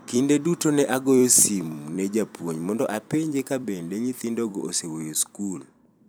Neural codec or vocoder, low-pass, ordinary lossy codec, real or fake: vocoder, 44.1 kHz, 128 mel bands every 256 samples, BigVGAN v2; none; none; fake